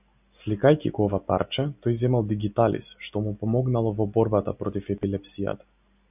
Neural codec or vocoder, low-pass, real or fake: none; 3.6 kHz; real